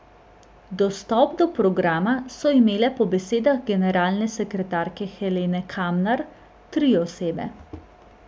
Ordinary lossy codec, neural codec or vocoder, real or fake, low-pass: none; none; real; none